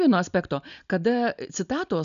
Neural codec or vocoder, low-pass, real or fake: none; 7.2 kHz; real